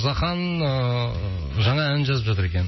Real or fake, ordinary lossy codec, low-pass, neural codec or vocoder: real; MP3, 24 kbps; 7.2 kHz; none